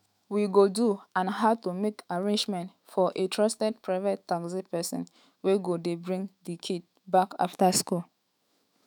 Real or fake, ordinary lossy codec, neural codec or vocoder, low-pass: fake; none; autoencoder, 48 kHz, 128 numbers a frame, DAC-VAE, trained on Japanese speech; none